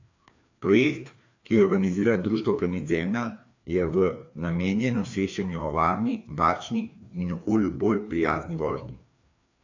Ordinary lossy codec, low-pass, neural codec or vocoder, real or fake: AAC, 48 kbps; 7.2 kHz; codec, 16 kHz, 2 kbps, FreqCodec, larger model; fake